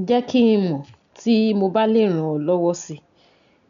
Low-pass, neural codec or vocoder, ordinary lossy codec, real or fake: 7.2 kHz; none; MP3, 96 kbps; real